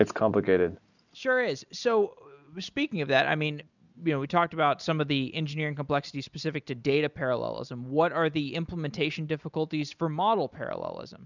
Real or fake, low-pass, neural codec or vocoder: real; 7.2 kHz; none